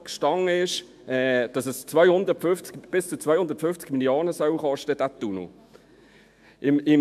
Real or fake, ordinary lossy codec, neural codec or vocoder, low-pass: fake; MP3, 96 kbps; autoencoder, 48 kHz, 128 numbers a frame, DAC-VAE, trained on Japanese speech; 14.4 kHz